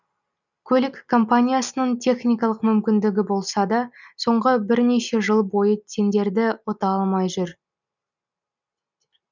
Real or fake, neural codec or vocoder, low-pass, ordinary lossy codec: real; none; 7.2 kHz; none